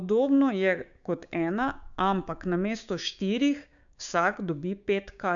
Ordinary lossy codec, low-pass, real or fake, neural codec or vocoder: none; 7.2 kHz; fake; codec, 16 kHz, 6 kbps, DAC